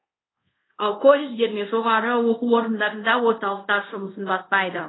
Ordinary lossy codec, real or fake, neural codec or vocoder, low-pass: AAC, 16 kbps; fake; codec, 24 kHz, 0.5 kbps, DualCodec; 7.2 kHz